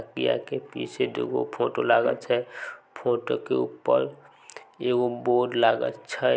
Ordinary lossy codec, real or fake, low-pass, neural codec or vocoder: none; real; none; none